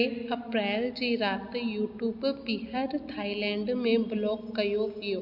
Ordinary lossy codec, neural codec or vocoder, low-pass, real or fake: MP3, 48 kbps; none; 5.4 kHz; real